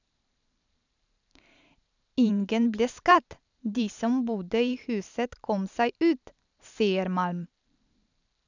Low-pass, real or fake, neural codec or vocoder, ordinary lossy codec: 7.2 kHz; fake; vocoder, 44.1 kHz, 128 mel bands every 512 samples, BigVGAN v2; none